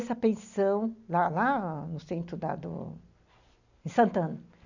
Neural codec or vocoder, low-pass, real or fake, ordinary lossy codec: none; 7.2 kHz; real; none